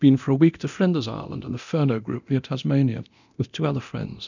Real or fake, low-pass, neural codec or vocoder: fake; 7.2 kHz; codec, 24 kHz, 0.9 kbps, DualCodec